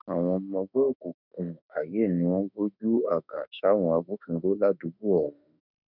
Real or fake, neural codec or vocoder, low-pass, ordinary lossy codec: fake; autoencoder, 48 kHz, 32 numbers a frame, DAC-VAE, trained on Japanese speech; 5.4 kHz; none